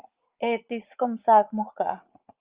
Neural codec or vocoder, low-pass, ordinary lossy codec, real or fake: vocoder, 44.1 kHz, 80 mel bands, Vocos; 3.6 kHz; Opus, 32 kbps; fake